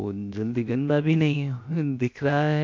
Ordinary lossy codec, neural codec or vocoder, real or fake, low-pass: MP3, 48 kbps; codec, 16 kHz, 0.7 kbps, FocalCodec; fake; 7.2 kHz